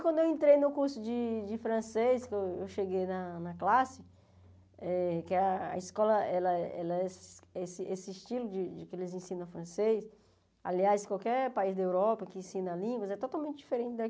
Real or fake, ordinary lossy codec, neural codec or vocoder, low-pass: real; none; none; none